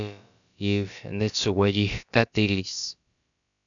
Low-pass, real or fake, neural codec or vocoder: 7.2 kHz; fake; codec, 16 kHz, about 1 kbps, DyCAST, with the encoder's durations